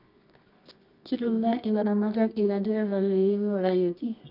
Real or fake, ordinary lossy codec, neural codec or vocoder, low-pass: fake; none; codec, 24 kHz, 0.9 kbps, WavTokenizer, medium music audio release; 5.4 kHz